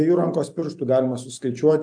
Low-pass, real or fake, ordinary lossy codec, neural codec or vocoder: 9.9 kHz; real; AAC, 64 kbps; none